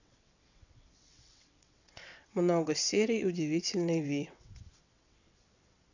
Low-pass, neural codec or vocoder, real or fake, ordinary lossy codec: 7.2 kHz; vocoder, 22.05 kHz, 80 mel bands, WaveNeXt; fake; none